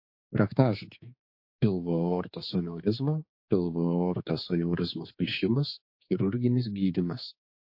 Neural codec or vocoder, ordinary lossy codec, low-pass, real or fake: codec, 16 kHz, 4 kbps, X-Codec, HuBERT features, trained on general audio; MP3, 32 kbps; 5.4 kHz; fake